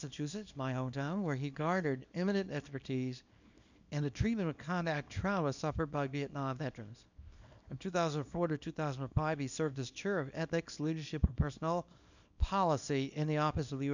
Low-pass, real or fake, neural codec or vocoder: 7.2 kHz; fake; codec, 24 kHz, 0.9 kbps, WavTokenizer, small release